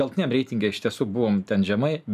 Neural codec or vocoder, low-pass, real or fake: none; 14.4 kHz; real